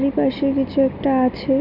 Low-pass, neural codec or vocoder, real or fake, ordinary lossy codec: 5.4 kHz; none; real; MP3, 48 kbps